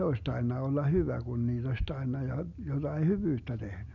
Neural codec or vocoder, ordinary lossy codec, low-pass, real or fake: none; none; 7.2 kHz; real